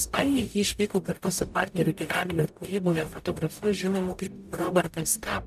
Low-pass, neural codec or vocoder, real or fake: 14.4 kHz; codec, 44.1 kHz, 0.9 kbps, DAC; fake